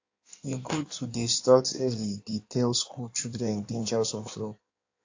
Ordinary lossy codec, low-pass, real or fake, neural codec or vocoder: AAC, 48 kbps; 7.2 kHz; fake; codec, 16 kHz in and 24 kHz out, 1.1 kbps, FireRedTTS-2 codec